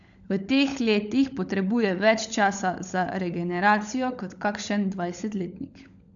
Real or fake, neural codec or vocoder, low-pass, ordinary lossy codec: fake; codec, 16 kHz, 16 kbps, FunCodec, trained on LibriTTS, 50 frames a second; 7.2 kHz; none